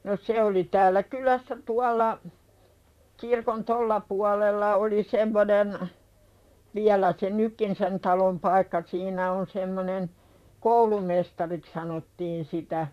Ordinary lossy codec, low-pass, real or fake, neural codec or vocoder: none; 14.4 kHz; fake; vocoder, 44.1 kHz, 128 mel bands, Pupu-Vocoder